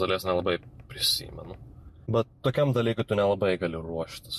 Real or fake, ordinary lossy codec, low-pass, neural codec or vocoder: real; MP3, 64 kbps; 14.4 kHz; none